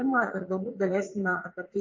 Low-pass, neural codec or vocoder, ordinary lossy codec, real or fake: 7.2 kHz; vocoder, 44.1 kHz, 80 mel bands, Vocos; MP3, 48 kbps; fake